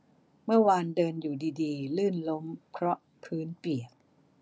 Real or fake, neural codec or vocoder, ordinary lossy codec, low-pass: real; none; none; none